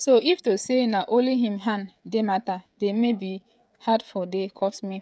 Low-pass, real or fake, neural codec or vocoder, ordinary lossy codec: none; fake; codec, 16 kHz, 16 kbps, FreqCodec, smaller model; none